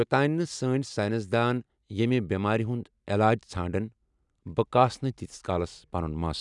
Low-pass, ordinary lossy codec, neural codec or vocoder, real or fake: 10.8 kHz; none; none; real